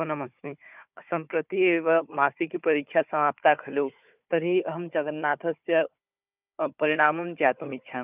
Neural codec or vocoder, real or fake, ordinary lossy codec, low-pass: codec, 16 kHz, 4 kbps, FunCodec, trained on Chinese and English, 50 frames a second; fake; none; 3.6 kHz